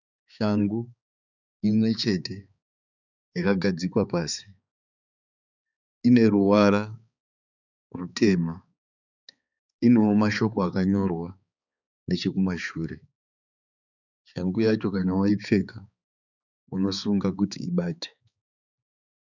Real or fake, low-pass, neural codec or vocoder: fake; 7.2 kHz; codec, 16 kHz, 4 kbps, X-Codec, HuBERT features, trained on balanced general audio